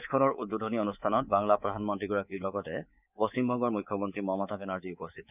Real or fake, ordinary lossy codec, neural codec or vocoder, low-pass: fake; none; codec, 24 kHz, 3.1 kbps, DualCodec; 3.6 kHz